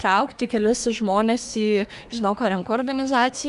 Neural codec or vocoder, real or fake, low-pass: codec, 24 kHz, 1 kbps, SNAC; fake; 10.8 kHz